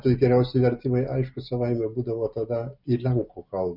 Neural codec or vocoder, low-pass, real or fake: none; 5.4 kHz; real